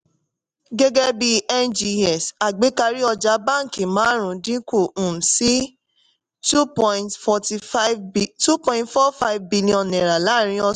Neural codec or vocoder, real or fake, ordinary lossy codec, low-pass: none; real; none; 10.8 kHz